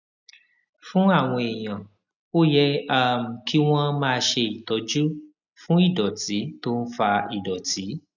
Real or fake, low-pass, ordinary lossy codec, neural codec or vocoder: real; 7.2 kHz; none; none